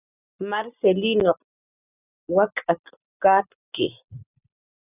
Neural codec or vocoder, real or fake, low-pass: none; real; 3.6 kHz